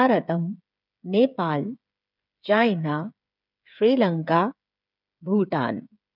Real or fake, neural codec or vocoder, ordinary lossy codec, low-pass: fake; codec, 16 kHz, 8 kbps, FreqCodec, smaller model; none; 5.4 kHz